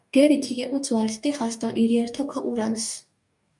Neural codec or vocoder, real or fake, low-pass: codec, 44.1 kHz, 2.6 kbps, DAC; fake; 10.8 kHz